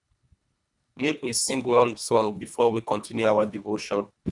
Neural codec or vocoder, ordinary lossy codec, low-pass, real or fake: codec, 24 kHz, 1.5 kbps, HILCodec; none; 10.8 kHz; fake